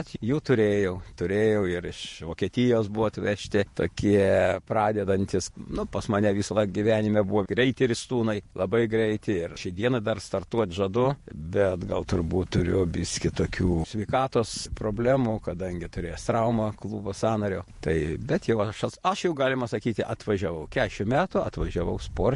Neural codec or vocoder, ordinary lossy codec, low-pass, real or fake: vocoder, 48 kHz, 128 mel bands, Vocos; MP3, 48 kbps; 14.4 kHz; fake